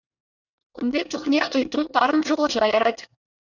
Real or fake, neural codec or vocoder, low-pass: fake; codec, 24 kHz, 1 kbps, SNAC; 7.2 kHz